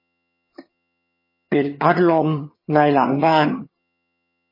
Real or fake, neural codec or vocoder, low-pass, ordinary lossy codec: fake; vocoder, 22.05 kHz, 80 mel bands, HiFi-GAN; 5.4 kHz; MP3, 24 kbps